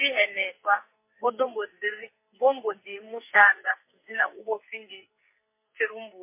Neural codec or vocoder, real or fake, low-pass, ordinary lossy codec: codec, 44.1 kHz, 2.6 kbps, SNAC; fake; 3.6 kHz; MP3, 24 kbps